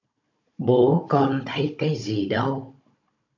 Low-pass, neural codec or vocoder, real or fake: 7.2 kHz; codec, 16 kHz, 16 kbps, FunCodec, trained on Chinese and English, 50 frames a second; fake